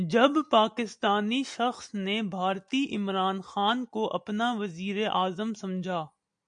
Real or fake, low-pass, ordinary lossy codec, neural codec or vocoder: real; 9.9 kHz; MP3, 64 kbps; none